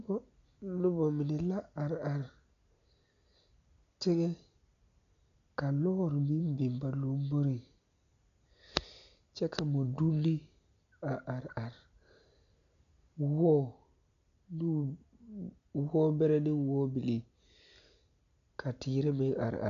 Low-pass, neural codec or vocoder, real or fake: 7.2 kHz; none; real